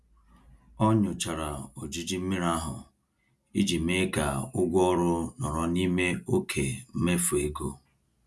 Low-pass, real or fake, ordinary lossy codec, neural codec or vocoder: none; real; none; none